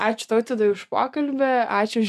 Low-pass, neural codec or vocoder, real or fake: 14.4 kHz; none; real